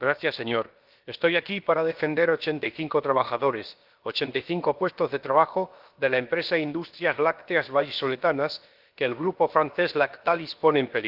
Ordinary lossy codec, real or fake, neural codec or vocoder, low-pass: Opus, 32 kbps; fake; codec, 16 kHz, about 1 kbps, DyCAST, with the encoder's durations; 5.4 kHz